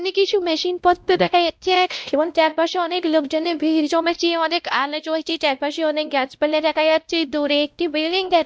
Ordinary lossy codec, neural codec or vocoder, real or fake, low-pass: none; codec, 16 kHz, 0.5 kbps, X-Codec, WavLM features, trained on Multilingual LibriSpeech; fake; none